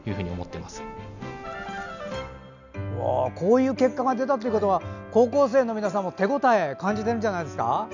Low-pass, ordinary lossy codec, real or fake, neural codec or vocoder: 7.2 kHz; none; real; none